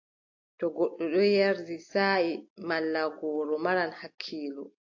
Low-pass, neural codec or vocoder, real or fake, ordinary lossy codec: 7.2 kHz; none; real; AAC, 48 kbps